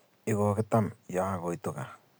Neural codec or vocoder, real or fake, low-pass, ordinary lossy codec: none; real; none; none